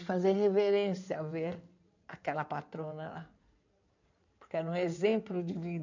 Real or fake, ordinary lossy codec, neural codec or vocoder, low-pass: fake; none; codec, 16 kHz in and 24 kHz out, 2.2 kbps, FireRedTTS-2 codec; 7.2 kHz